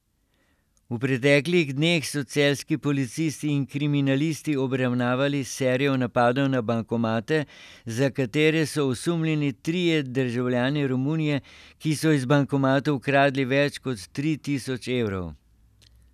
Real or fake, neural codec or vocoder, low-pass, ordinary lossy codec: real; none; 14.4 kHz; none